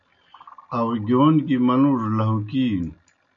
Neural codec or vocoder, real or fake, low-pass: none; real; 7.2 kHz